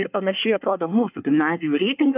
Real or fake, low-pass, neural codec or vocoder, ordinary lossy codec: fake; 3.6 kHz; codec, 24 kHz, 1 kbps, SNAC; AAC, 32 kbps